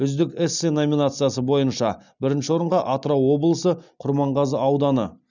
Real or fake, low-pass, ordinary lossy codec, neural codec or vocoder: real; 7.2 kHz; none; none